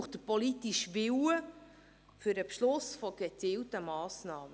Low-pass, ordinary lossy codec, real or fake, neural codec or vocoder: none; none; real; none